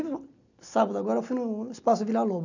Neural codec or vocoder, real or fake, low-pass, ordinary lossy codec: none; real; 7.2 kHz; none